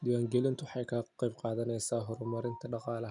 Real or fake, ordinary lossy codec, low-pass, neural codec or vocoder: real; none; 10.8 kHz; none